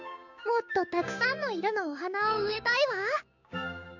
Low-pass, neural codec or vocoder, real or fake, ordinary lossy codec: 7.2 kHz; codec, 16 kHz, 6 kbps, DAC; fake; none